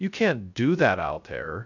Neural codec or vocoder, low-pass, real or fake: codec, 16 kHz, 0.2 kbps, FocalCodec; 7.2 kHz; fake